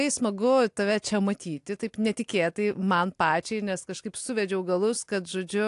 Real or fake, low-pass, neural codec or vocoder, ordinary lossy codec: real; 10.8 kHz; none; AAC, 64 kbps